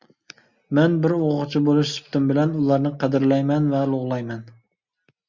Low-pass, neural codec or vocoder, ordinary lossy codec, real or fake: 7.2 kHz; none; Opus, 64 kbps; real